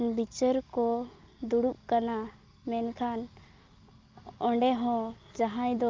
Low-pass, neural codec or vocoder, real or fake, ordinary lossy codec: 7.2 kHz; none; real; Opus, 24 kbps